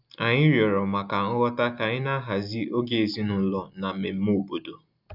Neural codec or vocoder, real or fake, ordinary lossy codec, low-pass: none; real; none; 5.4 kHz